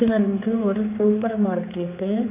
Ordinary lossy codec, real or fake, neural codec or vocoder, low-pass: MP3, 24 kbps; fake; codec, 16 kHz, 4 kbps, X-Codec, HuBERT features, trained on general audio; 3.6 kHz